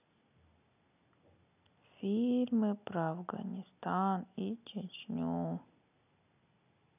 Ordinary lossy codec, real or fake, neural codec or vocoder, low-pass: none; real; none; 3.6 kHz